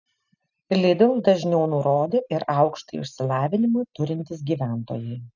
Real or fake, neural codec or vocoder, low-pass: real; none; 7.2 kHz